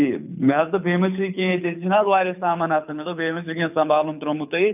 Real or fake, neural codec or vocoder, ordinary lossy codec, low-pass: fake; codec, 24 kHz, 6 kbps, HILCodec; none; 3.6 kHz